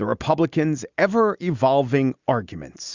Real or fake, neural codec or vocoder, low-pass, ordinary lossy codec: real; none; 7.2 kHz; Opus, 64 kbps